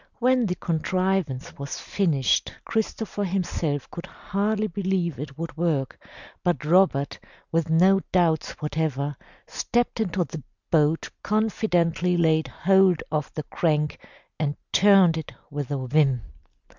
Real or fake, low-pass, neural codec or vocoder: real; 7.2 kHz; none